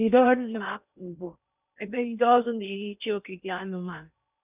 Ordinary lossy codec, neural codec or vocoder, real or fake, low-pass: none; codec, 16 kHz in and 24 kHz out, 0.6 kbps, FocalCodec, streaming, 4096 codes; fake; 3.6 kHz